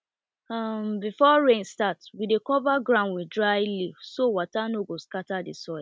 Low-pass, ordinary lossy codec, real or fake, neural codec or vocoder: none; none; real; none